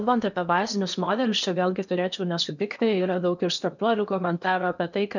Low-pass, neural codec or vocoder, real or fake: 7.2 kHz; codec, 16 kHz in and 24 kHz out, 0.8 kbps, FocalCodec, streaming, 65536 codes; fake